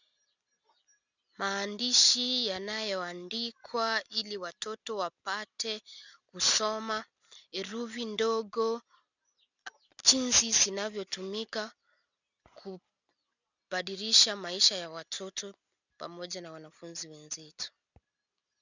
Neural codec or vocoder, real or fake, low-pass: none; real; 7.2 kHz